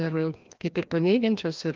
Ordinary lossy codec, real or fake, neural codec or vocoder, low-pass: Opus, 24 kbps; fake; codec, 16 kHz, 1 kbps, FreqCodec, larger model; 7.2 kHz